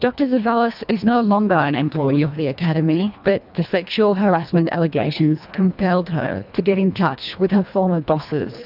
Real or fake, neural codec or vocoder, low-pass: fake; codec, 24 kHz, 1.5 kbps, HILCodec; 5.4 kHz